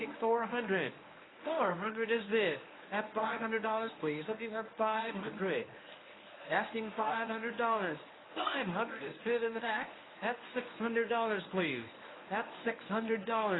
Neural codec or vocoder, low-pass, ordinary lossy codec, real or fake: codec, 24 kHz, 0.9 kbps, WavTokenizer, medium speech release version 1; 7.2 kHz; AAC, 16 kbps; fake